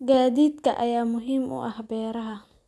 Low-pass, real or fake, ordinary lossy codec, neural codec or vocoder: none; real; none; none